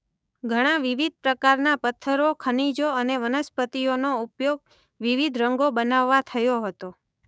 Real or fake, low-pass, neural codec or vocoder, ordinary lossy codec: fake; none; codec, 16 kHz, 6 kbps, DAC; none